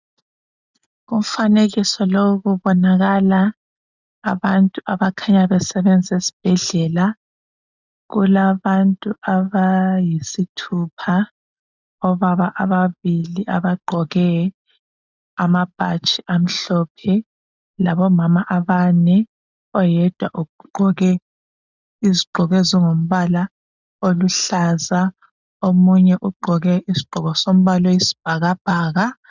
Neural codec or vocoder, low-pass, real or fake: none; 7.2 kHz; real